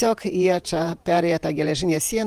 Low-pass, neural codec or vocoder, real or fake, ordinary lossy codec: 14.4 kHz; vocoder, 48 kHz, 128 mel bands, Vocos; fake; Opus, 32 kbps